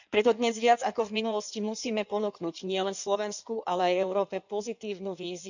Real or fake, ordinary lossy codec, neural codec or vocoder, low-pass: fake; none; codec, 16 kHz in and 24 kHz out, 1.1 kbps, FireRedTTS-2 codec; 7.2 kHz